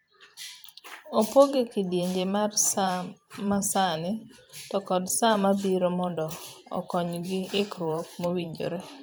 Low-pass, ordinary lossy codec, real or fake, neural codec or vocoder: none; none; fake; vocoder, 44.1 kHz, 128 mel bands every 512 samples, BigVGAN v2